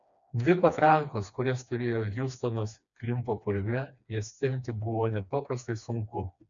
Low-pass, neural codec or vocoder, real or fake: 7.2 kHz; codec, 16 kHz, 2 kbps, FreqCodec, smaller model; fake